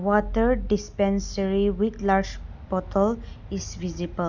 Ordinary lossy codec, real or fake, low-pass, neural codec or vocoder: none; real; 7.2 kHz; none